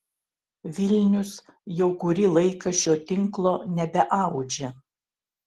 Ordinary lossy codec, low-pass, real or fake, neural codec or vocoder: Opus, 16 kbps; 14.4 kHz; real; none